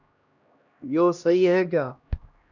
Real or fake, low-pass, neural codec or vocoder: fake; 7.2 kHz; codec, 16 kHz, 1 kbps, X-Codec, HuBERT features, trained on LibriSpeech